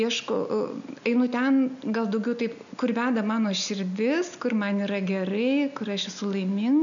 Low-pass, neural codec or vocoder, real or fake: 7.2 kHz; none; real